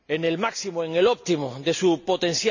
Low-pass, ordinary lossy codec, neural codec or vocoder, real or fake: 7.2 kHz; none; none; real